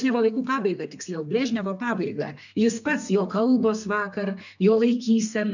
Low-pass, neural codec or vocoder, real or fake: 7.2 kHz; codec, 32 kHz, 1.9 kbps, SNAC; fake